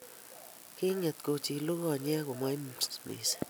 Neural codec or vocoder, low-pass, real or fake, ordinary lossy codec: none; none; real; none